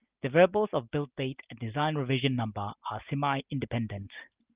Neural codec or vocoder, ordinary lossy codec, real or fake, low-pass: none; Opus, 32 kbps; real; 3.6 kHz